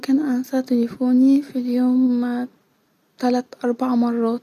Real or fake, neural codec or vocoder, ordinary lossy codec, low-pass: real; none; none; 14.4 kHz